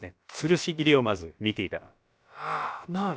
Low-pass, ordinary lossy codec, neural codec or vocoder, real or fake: none; none; codec, 16 kHz, about 1 kbps, DyCAST, with the encoder's durations; fake